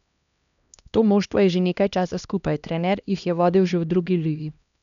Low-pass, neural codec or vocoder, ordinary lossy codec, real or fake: 7.2 kHz; codec, 16 kHz, 1 kbps, X-Codec, HuBERT features, trained on LibriSpeech; none; fake